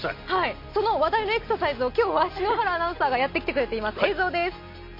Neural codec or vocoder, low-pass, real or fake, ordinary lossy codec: none; 5.4 kHz; real; MP3, 32 kbps